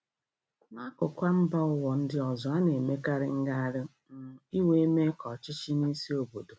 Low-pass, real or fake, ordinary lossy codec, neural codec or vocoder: none; real; none; none